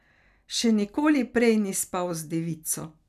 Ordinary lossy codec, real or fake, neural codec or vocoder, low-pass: none; real; none; 14.4 kHz